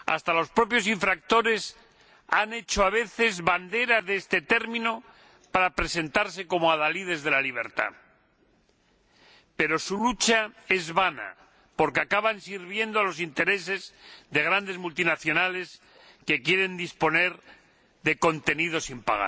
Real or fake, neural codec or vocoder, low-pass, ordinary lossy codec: real; none; none; none